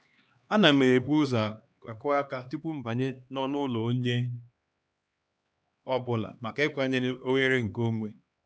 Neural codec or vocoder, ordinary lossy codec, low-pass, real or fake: codec, 16 kHz, 2 kbps, X-Codec, HuBERT features, trained on LibriSpeech; none; none; fake